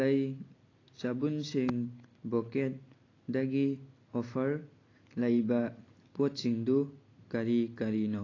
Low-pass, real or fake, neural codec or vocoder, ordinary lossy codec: 7.2 kHz; real; none; AAC, 32 kbps